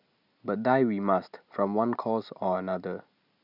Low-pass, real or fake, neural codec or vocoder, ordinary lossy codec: 5.4 kHz; real; none; none